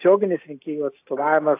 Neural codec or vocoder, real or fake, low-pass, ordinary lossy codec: none; real; 3.6 kHz; AAC, 24 kbps